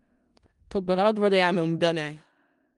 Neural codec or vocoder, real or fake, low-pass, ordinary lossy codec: codec, 16 kHz in and 24 kHz out, 0.4 kbps, LongCat-Audio-Codec, four codebook decoder; fake; 10.8 kHz; Opus, 32 kbps